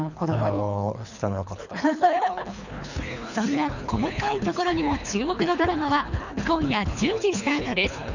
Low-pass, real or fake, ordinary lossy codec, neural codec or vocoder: 7.2 kHz; fake; none; codec, 24 kHz, 3 kbps, HILCodec